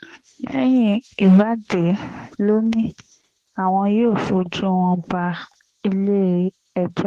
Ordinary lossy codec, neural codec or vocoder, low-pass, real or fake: Opus, 16 kbps; autoencoder, 48 kHz, 32 numbers a frame, DAC-VAE, trained on Japanese speech; 14.4 kHz; fake